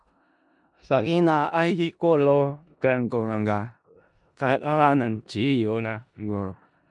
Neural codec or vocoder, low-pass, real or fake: codec, 16 kHz in and 24 kHz out, 0.4 kbps, LongCat-Audio-Codec, four codebook decoder; 10.8 kHz; fake